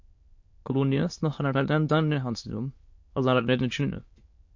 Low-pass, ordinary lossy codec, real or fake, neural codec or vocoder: 7.2 kHz; MP3, 48 kbps; fake; autoencoder, 22.05 kHz, a latent of 192 numbers a frame, VITS, trained on many speakers